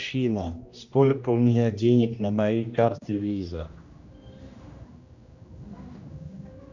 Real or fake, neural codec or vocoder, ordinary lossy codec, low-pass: fake; codec, 16 kHz, 1 kbps, X-Codec, HuBERT features, trained on general audio; Opus, 64 kbps; 7.2 kHz